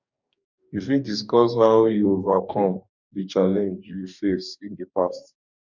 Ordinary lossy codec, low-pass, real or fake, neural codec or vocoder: none; 7.2 kHz; fake; codec, 44.1 kHz, 2.6 kbps, DAC